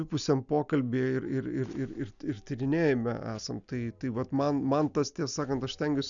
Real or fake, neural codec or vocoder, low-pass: real; none; 7.2 kHz